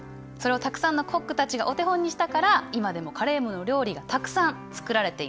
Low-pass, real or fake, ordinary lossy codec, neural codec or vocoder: none; real; none; none